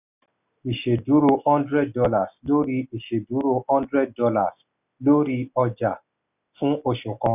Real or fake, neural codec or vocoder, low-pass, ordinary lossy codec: real; none; 3.6 kHz; none